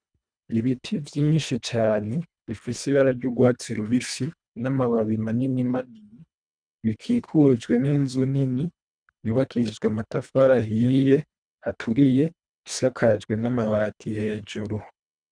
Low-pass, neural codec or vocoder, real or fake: 9.9 kHz; codec, 24 kHz, 1.5 kbps, HILCodec; fake